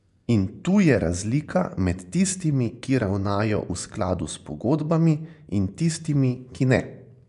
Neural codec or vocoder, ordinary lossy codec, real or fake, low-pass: vocoder, 24 kHz, 100 mel bands, Vocos; none; fake; 10.8 kHz